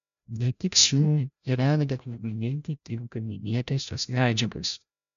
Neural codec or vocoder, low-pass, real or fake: codec, 16 kHz, 0.5 kbps, FreqCodec, larger model; 7.2 kHz; fake